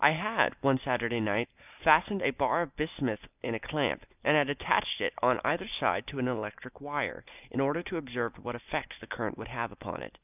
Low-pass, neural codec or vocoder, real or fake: 3.6 kHz; none; real